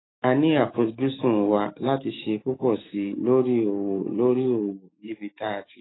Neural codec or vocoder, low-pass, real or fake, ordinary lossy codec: autoencoder, 48 kHz, 128 numbers a frame, DAC-VAE, trained on Japanese speech; 7.2 kHz; fake; AAC, 16 kbps